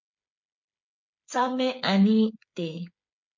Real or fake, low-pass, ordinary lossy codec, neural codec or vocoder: fake; 7.2 kHz; MP3, 48 kbps; codec, 16 kHz, 8 kbps, FreqCodec, smaller model